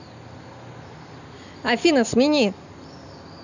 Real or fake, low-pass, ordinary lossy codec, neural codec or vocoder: real; 7.2 kHz; none; none